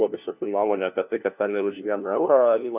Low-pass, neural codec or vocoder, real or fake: 3.6 kHz; codec, 16 kHz, 1 kbps, FunCodec, trained on LibriTTS, 50 frames a second; fake